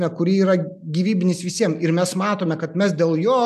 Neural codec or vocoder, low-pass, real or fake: none; 14.4 kHz; real